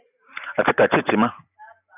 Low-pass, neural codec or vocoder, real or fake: 3.6 kHz; none; real